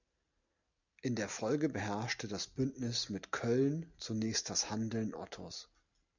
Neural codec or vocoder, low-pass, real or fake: none; 7.2 kHz; real